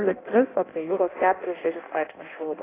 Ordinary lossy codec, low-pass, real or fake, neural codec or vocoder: AAC, 16 kbps; 3.6 kHz; fake; codec, 16 kHz in and 24 kHz out, 0.6 kbps, FireRedTTS-2 codec